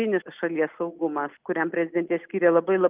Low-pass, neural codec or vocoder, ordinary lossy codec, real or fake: 3.6 kHz; none; Opus, 32 kbps; real